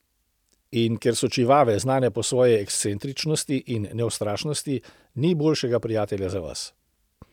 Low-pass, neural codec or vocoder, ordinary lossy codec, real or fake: 19.8 kHz; none; none; real